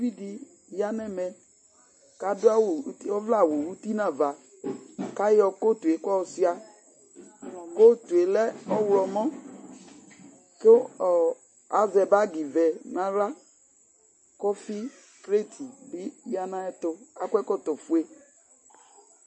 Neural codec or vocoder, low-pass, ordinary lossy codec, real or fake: none; 9.9 kHz; MP3, 32 kbps; real